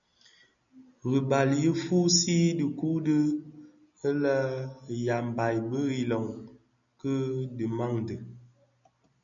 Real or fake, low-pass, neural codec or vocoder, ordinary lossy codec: real; 7.2 kHz; none; MP3, 96 kbps